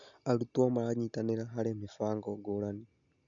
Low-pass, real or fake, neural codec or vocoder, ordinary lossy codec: 7.2 kHz; real; none; none